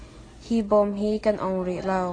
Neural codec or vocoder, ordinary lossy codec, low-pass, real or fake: none; AAC, 32 kbps; 9.9 kHz; real